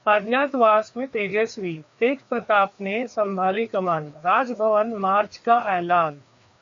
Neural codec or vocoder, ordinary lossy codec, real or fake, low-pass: codec, 16 kHz, 2 kbps, FreqCodec, larger model; MP3, 64 kbps; fake; 7.2 kHz